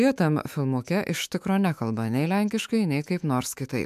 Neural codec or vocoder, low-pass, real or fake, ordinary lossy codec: autoencoder, 48 kHz, 128 numbers a frame, DAC-VAE, trained on Japanese speech; 14.4 kHz; fake; MP3, 96 kbps